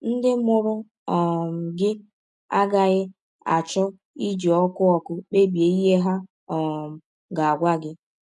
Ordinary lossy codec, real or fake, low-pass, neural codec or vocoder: none; real; none; none